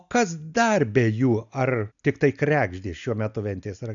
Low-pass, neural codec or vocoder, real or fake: 7.2 kHz; none; real